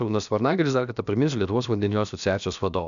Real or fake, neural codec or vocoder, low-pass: fake; codec, 16 kHz, about 1 kbps, DyCAST, with the encoder's durations; 7.2 kHz